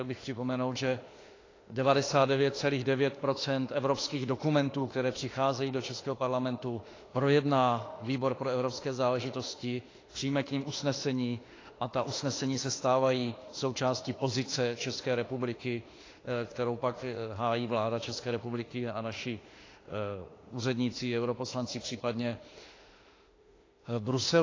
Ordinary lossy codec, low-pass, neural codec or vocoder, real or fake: AAC, 32 kbps; 7.2 kHz; autoencoder, 48 kHz, 32 numbers a frame, DAC-VAE, trained on Japanese speech; fake